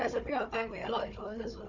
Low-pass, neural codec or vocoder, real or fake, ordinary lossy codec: 7.2 kHz; codec, 16 kHz, 4 kbps, FunCodec, trained on Chinese and English, 50 frames a second; fake; none